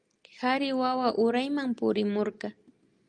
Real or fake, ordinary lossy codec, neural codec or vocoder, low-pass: real; Opus, 32 kbps; none; 9.9 kHz